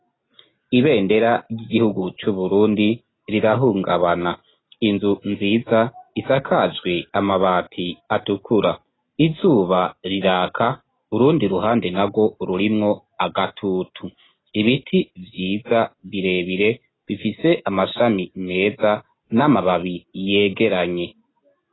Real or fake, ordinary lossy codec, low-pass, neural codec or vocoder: real; AAC, 16 kbps; 7.2 kHz; none